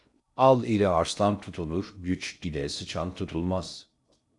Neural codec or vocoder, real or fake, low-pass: codec, 16 kHz in and 24 kHz out, 0.6 kbps, FocalCodec, streaming, 2048 codes; fake; 10.8 kHz